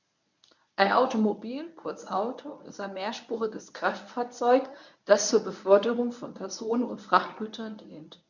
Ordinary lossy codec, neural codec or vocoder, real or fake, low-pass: none; codec, 24 kHz, 0.9 kbps, WavTokenizer, medium speech release version 1; fake; 7.2 kHz